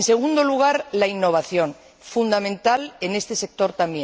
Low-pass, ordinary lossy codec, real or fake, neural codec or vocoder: none; none; real; none